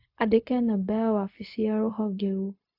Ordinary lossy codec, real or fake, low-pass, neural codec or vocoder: AAC, 32 kbps; fake; 5.4 kHz; codec, 16 kHz, 0.4 kbps, LongCat-Audio-Codec